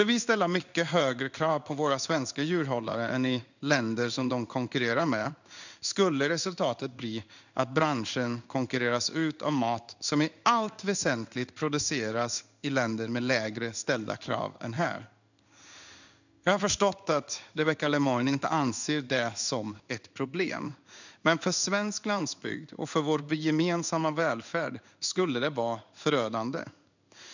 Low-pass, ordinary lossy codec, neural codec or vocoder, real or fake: 7.2 kHz; none; codec, 16 kHz in and 24 kHz out, 1 kbps, XY-Tokenizer; fake